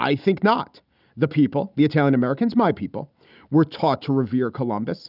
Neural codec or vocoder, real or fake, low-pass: none; real; 5.4 kHz